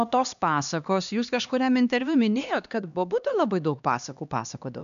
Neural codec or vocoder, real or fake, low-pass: codec, 16 kHz, 2 kbps, X-Codec, HuBERT features, trained on LibriSpeech; fake; 7.2 kHz